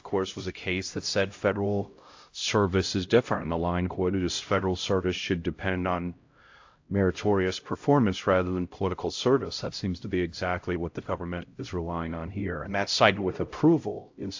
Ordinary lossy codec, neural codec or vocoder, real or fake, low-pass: AAC, 48 kbps; codec, 16 kHz, 0.5 kbps, X-Codec, HuBERT features, trained on LibriSpeech; fake; 7.2 kHz